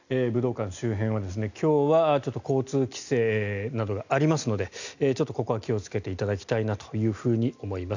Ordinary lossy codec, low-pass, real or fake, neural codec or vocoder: none; 7.2 kHz; real; none